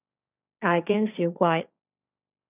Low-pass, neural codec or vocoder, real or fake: 3.6 kHz; codec, 16 kHz, 1.1 kbps, Voila-Tokenizer; fake